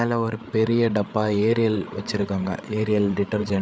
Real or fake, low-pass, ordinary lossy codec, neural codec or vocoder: fake; none; none; codec, 16 kHz, 8 kbps, FreqCodec, larger model